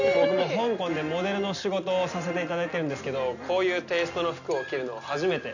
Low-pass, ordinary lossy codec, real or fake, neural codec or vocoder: 7.2 kHz; none; real; none